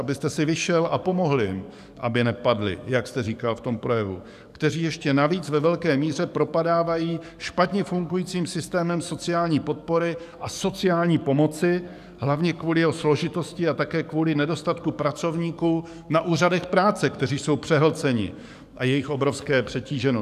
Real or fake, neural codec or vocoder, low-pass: fake; codec, 44.1 kHz, 7.8 kbps, DAC; 14.4 kHz